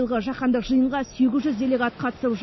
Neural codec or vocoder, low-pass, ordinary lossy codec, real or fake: none; 7.2 kHz; MP3, 24 kbps; real